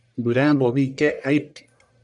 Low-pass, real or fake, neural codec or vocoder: 10.8 kHz; fake; codec, 44.1 kHz, 1.7 kbps, Pupu-Codec